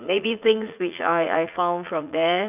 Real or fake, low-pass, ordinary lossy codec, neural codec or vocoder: fake; 3.6 kHz; none; vocoder, 44.1 kHz, 80 mel bands, Vocos